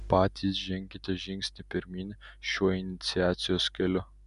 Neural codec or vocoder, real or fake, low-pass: none; real; 10.8 kHz